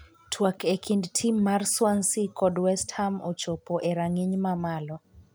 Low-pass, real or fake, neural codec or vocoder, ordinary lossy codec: none; real; none; none